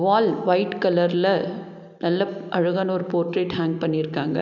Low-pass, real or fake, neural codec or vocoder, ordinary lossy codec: 7.2 kHz; real; none; none